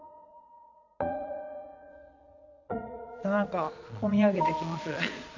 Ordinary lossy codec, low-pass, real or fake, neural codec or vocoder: none; 7.2 kHz; fake; vocoder, 22.05 kHz, 80 mel bands, Vocos